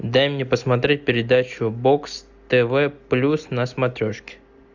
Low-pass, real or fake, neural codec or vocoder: 7.2 kHz; real; none